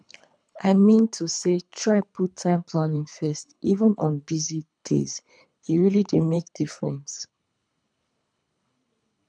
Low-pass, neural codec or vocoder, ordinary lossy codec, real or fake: 9.9 kHz; codec, 24 kHz, 3 kbps, HILCodec; none; fake